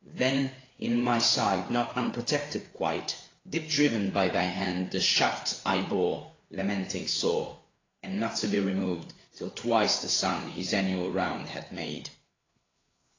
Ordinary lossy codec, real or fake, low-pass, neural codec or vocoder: AAC, 32 kbps; fake; 7.2 kHz; codec, 16 kHz, 4 kbps, FreqCodec, larger model